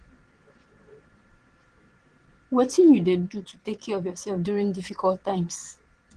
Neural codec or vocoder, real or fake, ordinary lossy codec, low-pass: vocoder, 22.05 kHz, 80 mel bands, Vocos; fake; Opus, 16 kbps; 9.9 kHz